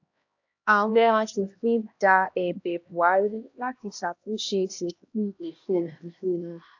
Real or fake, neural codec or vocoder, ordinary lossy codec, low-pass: fake; codec, 16 kHz, 1 kbps, X-Codec, HuBERT features, trained on LibriSpeech; AAC, 48 kbps; 7.2 kHz